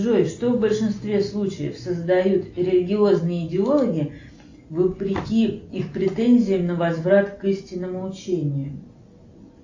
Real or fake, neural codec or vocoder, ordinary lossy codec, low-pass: real; none; AAC, 48 kbps; 7.2 kHz